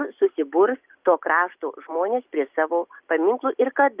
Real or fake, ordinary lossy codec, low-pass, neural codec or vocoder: real; Opus, 24 kbps; 3.6 kHz; none